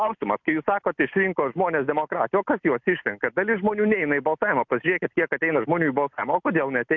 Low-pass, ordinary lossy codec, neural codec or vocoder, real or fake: 7.2 kHz; MP3, 64 kbps; none; real